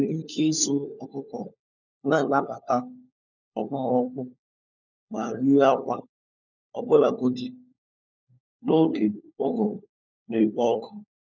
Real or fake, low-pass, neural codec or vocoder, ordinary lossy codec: fake; 7.2 kHz; codec, 16 kHz, 4 kbps, FunCodec, trained on LibriTTS, 50 frames a second; none